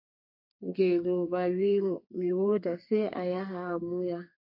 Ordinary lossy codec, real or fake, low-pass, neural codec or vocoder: MP3, 32 kbps; fake; 5.4 kHz; codec, 32 kHz, 1.9 kbps, SNAC